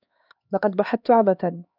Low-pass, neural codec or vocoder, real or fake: 5.4 kHz; codec, 16 kHz, 2 kbps, X-Codec, HuBERT features, trained on LibriSpeech; fake